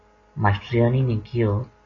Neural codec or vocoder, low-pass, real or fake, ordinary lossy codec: none; 7.2 kHz; real; AAC, 32 kbps